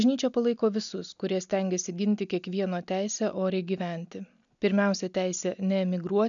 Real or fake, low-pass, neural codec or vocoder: real; 7.2 kHz; none